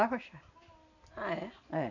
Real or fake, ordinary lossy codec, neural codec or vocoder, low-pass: real; none; none; 7.2 kHz